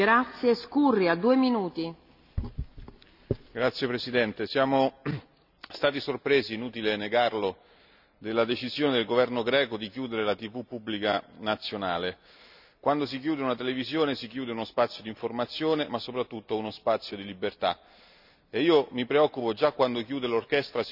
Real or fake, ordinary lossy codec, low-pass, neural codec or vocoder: real; none; 5.4 kHz; none